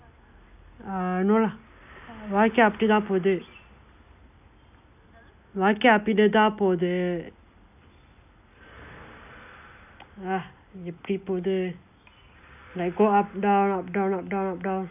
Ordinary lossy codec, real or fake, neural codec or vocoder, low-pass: none; real; none; 3.6 kHz